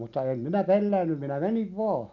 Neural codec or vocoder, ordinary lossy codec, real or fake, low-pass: none; none; real; 7.2 kHz